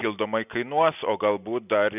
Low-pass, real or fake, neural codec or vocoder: 3.6 kHz; real; none